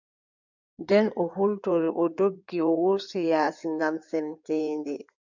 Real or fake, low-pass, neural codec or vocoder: fake; 7.2 kHz; codec, 16 kHz in and 24 kHz out, 2.2 kbps, FireRedTTS-2 codec